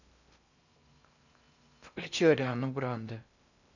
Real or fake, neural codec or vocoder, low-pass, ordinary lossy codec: fake; codec, 16 kHz in and 24 kHz out, 0.6 kbps, FocalCodec, streaming, 2048 codes; 7.2 kHz; none